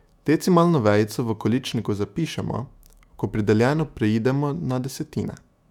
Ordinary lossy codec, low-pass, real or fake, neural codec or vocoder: none; 19.8 kHz; fake; autoencoder, 48 kHz, 128 numbers a frame, DAC-VAE, trained on Japanese speech